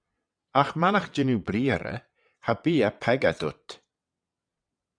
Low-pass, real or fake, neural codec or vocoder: 9.9 kHz; fake; vocoder, 22.05 kHz, 80 mel bands, WaveNeXt